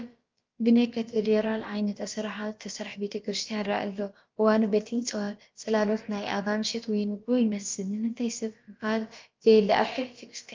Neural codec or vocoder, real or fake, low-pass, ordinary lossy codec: codec, 16 kHz, about 1 kbps, DyCAST, with the encoder's durations; fake; 7.2 kHz; Opus, 24 kbps